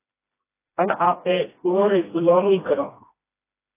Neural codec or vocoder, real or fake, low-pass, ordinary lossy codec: codec, 16 kHz, 1 kbps, FreqCodec, smaller model; fake; 3.6 kHz; AAC, 24 kbps